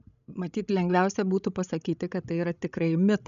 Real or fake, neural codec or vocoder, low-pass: fake; codec, 16 kHz, 16 kbps, FreqCodec, larger model; 7.2 kHz